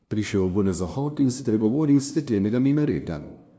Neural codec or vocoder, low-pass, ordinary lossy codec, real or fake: codec, 16 kHz, 0.5 kbps, FunCodec, trained on LibriTTS, 25 frames a second; none; none; fake